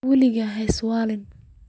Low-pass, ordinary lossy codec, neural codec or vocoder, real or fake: none; none; none; real